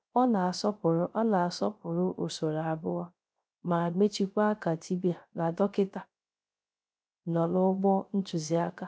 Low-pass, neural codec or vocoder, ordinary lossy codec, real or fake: none; codec, 16 kHz, 0.3 kbps, FocalCodec; none; fake